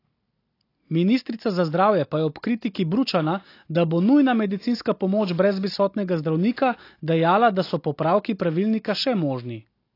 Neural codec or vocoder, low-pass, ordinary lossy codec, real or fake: none; 5.4 kHz; AAC, 32 kbps; real